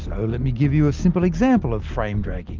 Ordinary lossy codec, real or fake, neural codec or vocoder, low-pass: Opus, 32 kbps; real; none; 7.2 kHz